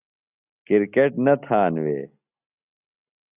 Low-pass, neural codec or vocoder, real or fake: 3.6 kHz; none; real